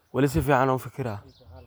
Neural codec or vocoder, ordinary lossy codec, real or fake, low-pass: none; none; real; none